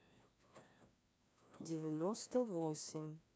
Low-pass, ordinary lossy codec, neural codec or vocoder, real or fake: none; none; codec, 16 kHz, 1 kbps, FreqCodec, larger model; fake